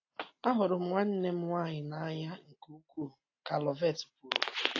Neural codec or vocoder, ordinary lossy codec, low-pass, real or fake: none; AAC, 32 kbps; 7.2 kHz; real